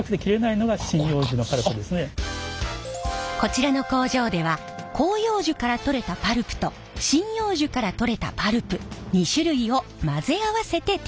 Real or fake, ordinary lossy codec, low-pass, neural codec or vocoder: real; none; none; none